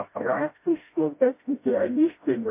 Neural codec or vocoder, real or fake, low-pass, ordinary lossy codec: codec, 16 kHz, 0.5 kbps, FreqCodec, smaller model; fake; 3.6 kHz; MP3, 24 kbps